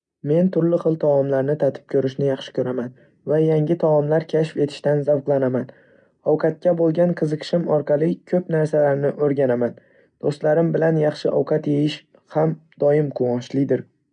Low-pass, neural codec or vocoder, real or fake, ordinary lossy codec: 9.9 kHz; none; real; MP3, 96 kbps